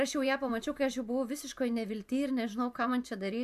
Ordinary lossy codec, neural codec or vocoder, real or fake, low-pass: AAC, 96 kbps; vocoder, 44.1 kHz, 128 mel bands every 512 samples, BigVGAN v2; fake; 14.4 kHz